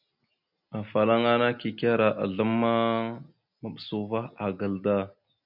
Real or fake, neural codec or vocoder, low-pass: real; none; 5.4 kHz